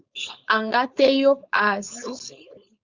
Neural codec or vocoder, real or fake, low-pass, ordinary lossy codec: codec, 16 kHz, 4.8 kbps, FACodec; fake; 7.2 kHz; Opus, 64 kbps